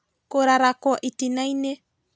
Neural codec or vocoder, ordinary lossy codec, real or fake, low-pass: none; none; real; none